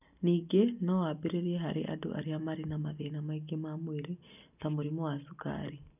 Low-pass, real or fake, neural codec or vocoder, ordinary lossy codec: 3.6 kHz; real; none; none